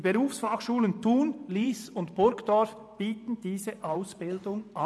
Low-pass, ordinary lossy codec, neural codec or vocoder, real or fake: none; none; vocoder, 24 kHz, 100 mel bands, Vocos; fake